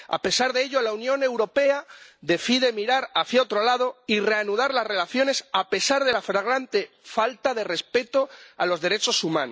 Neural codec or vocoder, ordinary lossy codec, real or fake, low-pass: none; none; real; none